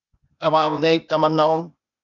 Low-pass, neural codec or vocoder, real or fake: 7.2 kHz; codec, 16 kHz, 0.8 kbps, ZipCodec; fake